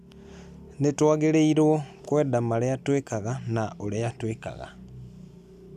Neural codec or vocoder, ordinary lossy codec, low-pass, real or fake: none; none; 14.4 kHz; real